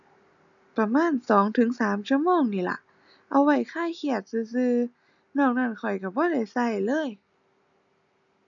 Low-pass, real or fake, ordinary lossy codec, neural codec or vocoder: 7.2 kHz; real; none; none